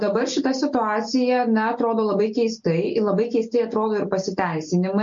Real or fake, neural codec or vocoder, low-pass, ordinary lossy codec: real; none; 7.2 kHz; MP3, 48 kbps